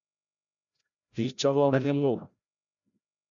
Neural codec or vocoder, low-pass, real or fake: codec, 16 kHz, 0.5 kbps, FreqCodec, larger model; 7.2 kHz; fake